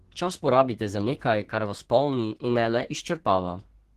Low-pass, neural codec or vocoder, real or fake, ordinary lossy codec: 14.4 kHz; codec, 32 kHz, 1.9 kbps, SNAC; fake; Opus, 16 kbps